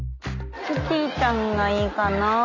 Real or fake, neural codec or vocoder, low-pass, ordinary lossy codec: real; none; 7.2 kHz; none